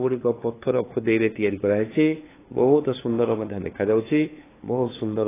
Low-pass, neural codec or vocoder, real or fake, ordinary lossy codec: 3.6 kHz; codec, 16 kHz, 2 kbps, FunCodec, trained on LibriTTS, 25 frames a second; fake; AAC, 16 kbps